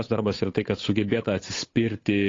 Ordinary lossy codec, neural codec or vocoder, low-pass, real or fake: AAC, 32 kbps; codec, 16 kHz, 8 kbps, FunCodec, trained on Chinese and English, 25 frames a second; 7.2 kHz; fake